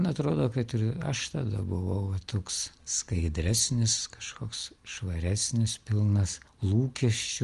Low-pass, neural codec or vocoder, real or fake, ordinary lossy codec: 10.8 kHz; none; real; Opus, 24 kbps